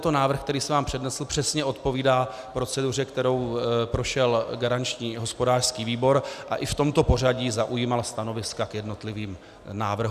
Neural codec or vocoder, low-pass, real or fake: none; 14.4 kHz; real